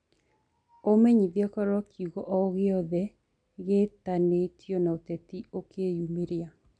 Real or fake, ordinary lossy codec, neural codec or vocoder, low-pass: real; none; none; 9.9 kHz